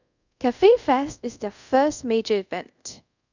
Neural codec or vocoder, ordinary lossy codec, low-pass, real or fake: codec, 24 kHz, 0.5 kbps, DualCodec; none; 7.2 kHz; fake